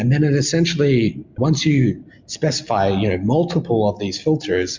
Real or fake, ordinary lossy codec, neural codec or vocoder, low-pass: real; MP3, 64 kbps; none; 7.2 kHz